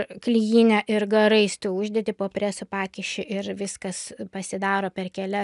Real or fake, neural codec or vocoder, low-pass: real; none; 10.8 kHz